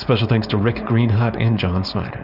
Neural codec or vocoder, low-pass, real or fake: codec, 16 kHz, 4.8 kbps, FACodec; 5.4 kHz; fake